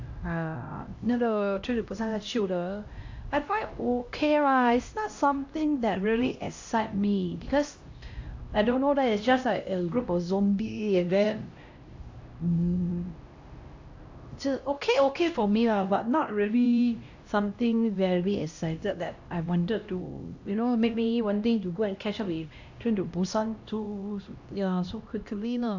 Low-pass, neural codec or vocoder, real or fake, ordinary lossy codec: 7.2 kHz; codec, 16 kHz, 1 kbps, X-Codec, HuBERT features, trained on LibriSpeech; fake; AAC, 48 kbps